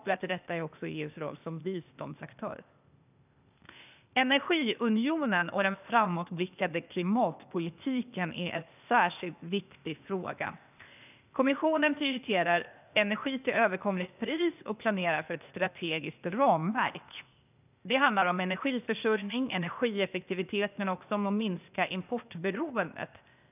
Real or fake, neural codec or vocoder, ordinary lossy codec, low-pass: fake; codec, 16 kHz, 0.8 kbps, ZipCodec; none; 3.6 kHz